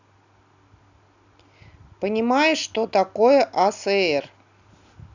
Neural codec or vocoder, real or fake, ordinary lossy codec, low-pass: none; real; none; 7.2 kHz